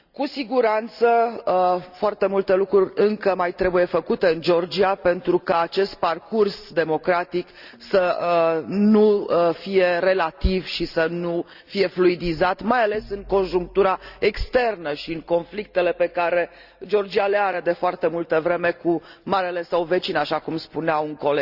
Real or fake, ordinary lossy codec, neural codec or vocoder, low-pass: real; Opus, 64 kbps; none; 5.4 kHz